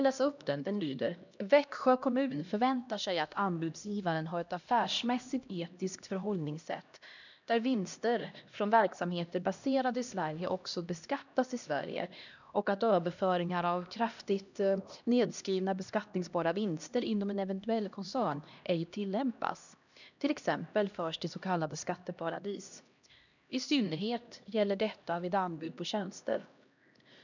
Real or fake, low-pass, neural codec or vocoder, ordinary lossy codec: fake; 7.2 kHz; codec, 16 kHz, 1 kbps, X-Codec, HuBERT features, trained on LibriSpeech; none